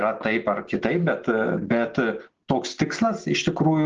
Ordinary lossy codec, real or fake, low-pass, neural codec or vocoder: Opus, 16 kbps; real; 7.2 kHz; none